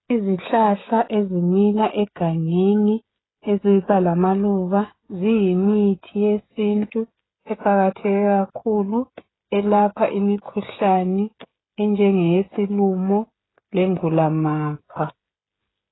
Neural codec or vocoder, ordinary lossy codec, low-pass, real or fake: codec, 16 kHz, 16 kbps, FreqCodec, smaller model; AAC, 16 kbps; 7.2 kHz; fake